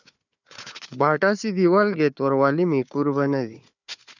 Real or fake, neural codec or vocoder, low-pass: fake; codec, 16 kHz, 4 kbps, FunCodec, trained on Chinese and English, 50 frames a second; 7.2 kHz